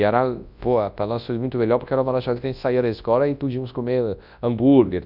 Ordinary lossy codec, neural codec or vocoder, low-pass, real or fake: none; codec, 24 kHz, 0.9 kbps, WavTokenizer, large speech release; 5.4 kHz; fake